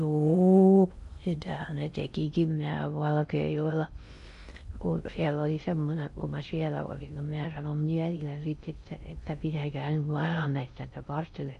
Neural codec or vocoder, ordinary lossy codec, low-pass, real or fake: codec, 16 kHz in and 24 kHz out, 0.6 kbps, FocalCodec, streaming, 4096 codes; MP3, 96 kbps; 10.8 kHz; fake